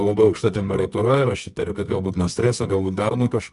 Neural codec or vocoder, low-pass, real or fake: codec, 24 kHz, 0.9 kbps, WavTokenizer, medium music audio release; 10.8 kHz; fake